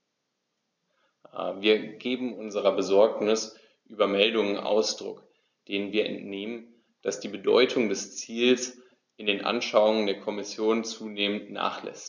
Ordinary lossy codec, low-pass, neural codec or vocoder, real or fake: none; 7.2 kHz; none; real